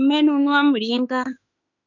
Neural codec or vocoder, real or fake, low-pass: autoencoder, 48 kHz, 32 numbers a frame, DAC-VAE, trained on Japanese speech; fake; 7.2 kHz